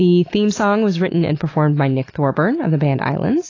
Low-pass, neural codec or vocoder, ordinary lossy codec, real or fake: 7.2 kHz; none; AAC, 32 kbps; real